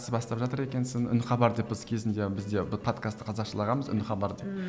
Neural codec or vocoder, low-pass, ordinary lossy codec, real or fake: none; none; none; real